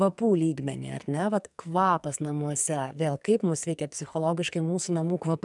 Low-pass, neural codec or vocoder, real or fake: 10.8 kHz; codec, 44.1 kHz, 2.6 kbps, SNAC; fake